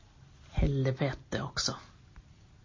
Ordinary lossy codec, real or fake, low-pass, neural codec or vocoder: MP3, 32 kbps; real; 7.2 kHz; none